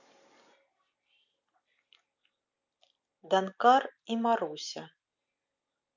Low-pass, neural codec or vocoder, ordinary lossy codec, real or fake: 7.2 kHz; none; none; real